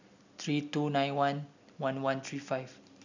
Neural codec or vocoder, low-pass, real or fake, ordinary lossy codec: none; 7.2 kHz; real; none